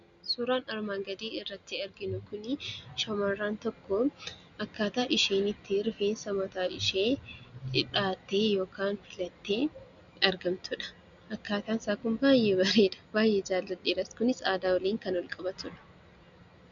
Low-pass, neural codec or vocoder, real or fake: 7.2 kHz; none; real